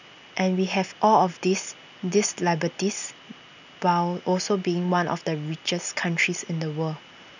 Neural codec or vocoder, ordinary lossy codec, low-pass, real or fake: none; none; 7.2 kHz; real